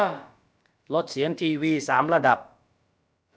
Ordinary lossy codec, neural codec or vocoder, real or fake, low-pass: none; codec, 16 kHz, about 1 kbps, DyCAST, with the encoder's durations; fake; none